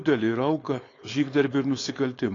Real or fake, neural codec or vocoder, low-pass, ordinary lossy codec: fake; codec, 16 kHz, 4.8 kbps, FACodec; 7.2 kHz; AAC, 32 kbps